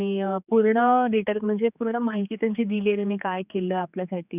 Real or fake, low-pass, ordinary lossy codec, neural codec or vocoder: fake; 3.6 kHz; none; codec, 16 kHz, 4 kbps, X-Codec, HuBERT features, trained on general audio